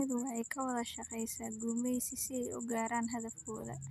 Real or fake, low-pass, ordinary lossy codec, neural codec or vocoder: real; 14.4 kHz; none; none